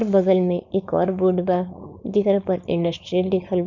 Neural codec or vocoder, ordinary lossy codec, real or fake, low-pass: codec, 16 kHz, 4.8 kbps, FACodec; none; fake; 7.2 kHz